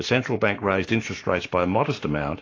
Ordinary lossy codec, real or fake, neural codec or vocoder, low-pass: AAC, 32 kbps; fake; codec, 44.1 kHz, 7.8 kbps, Pupu-Codec; 7.2 kHz